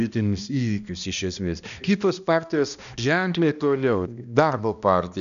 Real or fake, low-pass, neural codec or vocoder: fake; 7.2 kHz; codec, 16 kHz, 1 kbps, X-Codec, HuBERT features, trained on balanced general audio